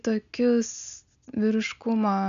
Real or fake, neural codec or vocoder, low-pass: real; none; 7.2 kHz